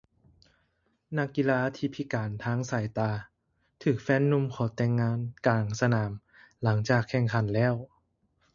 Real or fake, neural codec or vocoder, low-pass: real; none; 7.2 kHz